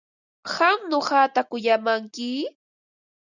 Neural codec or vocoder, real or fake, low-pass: none; real; 7.2 kHz